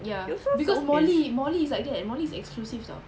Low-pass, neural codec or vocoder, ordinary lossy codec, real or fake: none; none; none; real